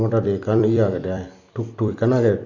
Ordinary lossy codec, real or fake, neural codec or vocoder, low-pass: none; fake; vocoder, 44.1 kHz, 128 mel bands every 256 samples, BigVGAN v2; 7.2 kHz